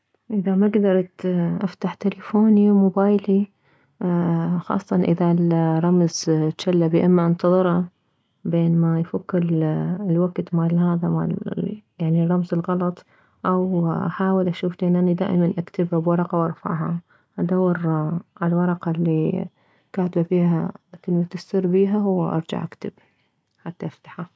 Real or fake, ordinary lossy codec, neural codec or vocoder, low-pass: real; none; none; none